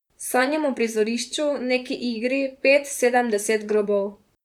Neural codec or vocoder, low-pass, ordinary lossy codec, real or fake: vocoder, 44.1 kHz, 128 mel bands, Pupu-Vocoder; 19.8 kHz; none; fake